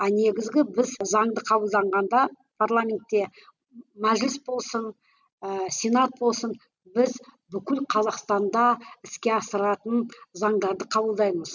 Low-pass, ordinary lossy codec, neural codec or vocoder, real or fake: 7.2 kHz; none; none; real